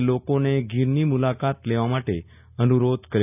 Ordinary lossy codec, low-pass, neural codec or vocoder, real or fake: none; 3.6 kHz; none; real